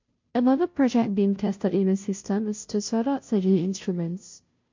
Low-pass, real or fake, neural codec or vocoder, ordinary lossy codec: 7.2 kHz; fake; codec, 16 kHz, 0.5 kbps, FunCodec, trained on Chinese and English, 25 frames a second; MP3, 48 kbps